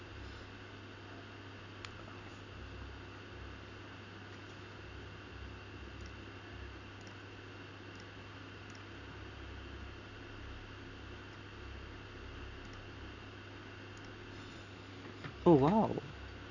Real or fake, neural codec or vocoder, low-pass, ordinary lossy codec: real; none; 7.2 kHz; none